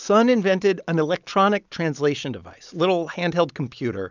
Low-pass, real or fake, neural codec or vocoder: 7.2 kHz; real; none